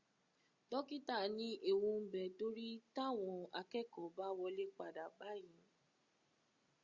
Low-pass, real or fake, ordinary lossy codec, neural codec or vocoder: 7.2 kHz; real; Opus, 64 kbps; none